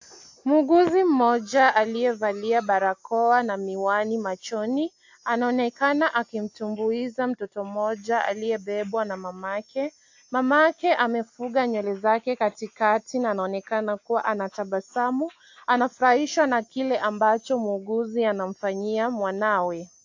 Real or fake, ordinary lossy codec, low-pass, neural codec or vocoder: real; AAC, 48 kbps; 7.2 kHz; none